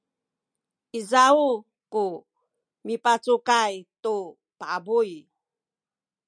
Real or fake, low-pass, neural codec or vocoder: real; 9.9 kHz; none